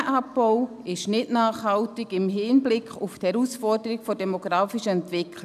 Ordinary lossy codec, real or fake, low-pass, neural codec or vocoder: none; real; 14.4 kHz; none